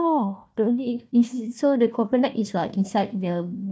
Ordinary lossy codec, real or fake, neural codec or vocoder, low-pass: none; fake; codec, 16 kHz, 1 kbps, FunCodec, trained on Chinese and English, 50 frames a second; none